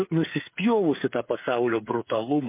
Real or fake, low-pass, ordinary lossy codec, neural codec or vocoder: fake; 3.6 kHz; MP3, 24 kbps; codec, 16 kHz, 8 kbps, FreqCodec, smaller model